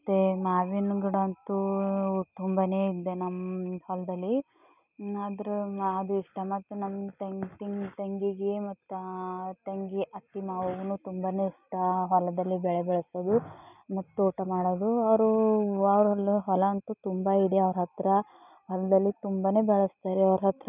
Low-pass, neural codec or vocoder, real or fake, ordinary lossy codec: 3.6 kHz; none; real; none